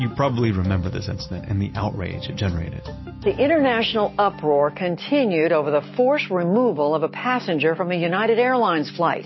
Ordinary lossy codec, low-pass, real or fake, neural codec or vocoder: MP3, 24 kbps; 7.2 kHz; real; none